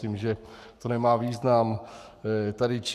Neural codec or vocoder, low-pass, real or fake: codec, 44.1 kHz, 7.8 kbps, Pupu-Codec; 14.4 kHz; fake